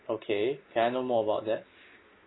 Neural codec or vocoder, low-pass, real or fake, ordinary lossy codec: none; 7.2 kHz; real; AAC, 16 kbps